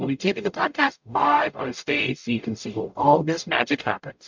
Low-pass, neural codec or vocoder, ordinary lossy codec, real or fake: 7.2 kHz; codec, 44.1 kHz, 0.9 kbps, DAC; MP3, 64 kbps; fake